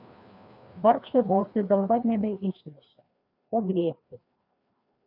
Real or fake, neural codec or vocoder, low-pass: fake; codec, 16 kHz, 2 kbps, FreqCodec, larger model; 5.4 kHz